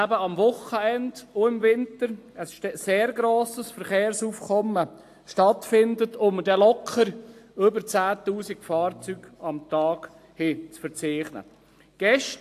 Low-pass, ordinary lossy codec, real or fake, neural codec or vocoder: 14.4 kHz; AAC, 64 kbps; real; none